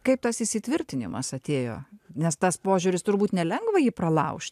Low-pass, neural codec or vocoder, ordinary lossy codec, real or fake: 14.4 kHz; none; AAC, 96 kbps; real